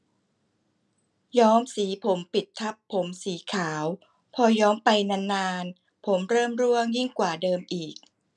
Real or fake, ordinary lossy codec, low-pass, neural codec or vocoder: real; none; 10.8 kHz; none